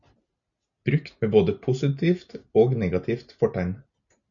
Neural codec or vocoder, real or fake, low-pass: none; real; 7.2 kHz